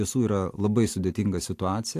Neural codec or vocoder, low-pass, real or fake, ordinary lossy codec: vocoder, 44.1 kHz, 128 mel bands every 256 samples, BigVGAN v2; 14.4 kHz; fake; AAC, 64 kbps